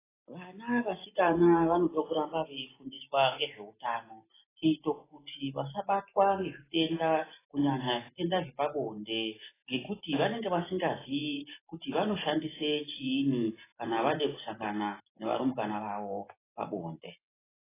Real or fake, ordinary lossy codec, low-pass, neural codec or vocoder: real; AAC, 16 kbps; 3.6 kHz; none